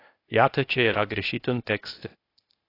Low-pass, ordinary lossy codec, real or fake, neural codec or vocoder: 5.4 kHz; AAC, 24 kbps; fake; codec, 16 kHz, 0.8 kbps, ZipCodec